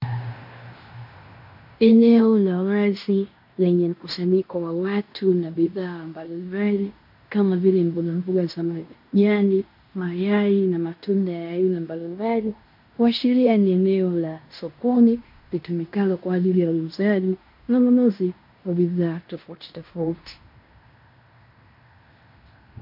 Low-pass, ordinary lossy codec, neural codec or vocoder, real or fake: 5.4 kHz; MP3, 48 kbps; codec, 16 kHz in and 24 kHz out, 0.9 kbps, LongCat-Audio-Codec, fine tuned four codebook decoder; fake